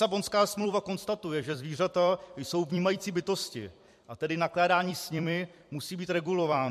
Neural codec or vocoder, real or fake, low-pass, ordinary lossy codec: vocoder, 44.1 kHz, 128 mel bands every 256 samples, BigVGAN v2; fake; 14.4 kHz; MP3, 64 kbps